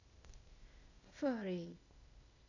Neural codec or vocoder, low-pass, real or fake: codec, 16 kHz, 0.8 kbps, ZipCodec; 7.2 kHz; fake